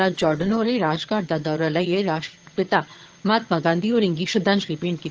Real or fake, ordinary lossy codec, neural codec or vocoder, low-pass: fake; Opus, 16 kbps; vocoder, 22.05 kHz, 80 mel bands, HiFi-GAN; 7.2 kHz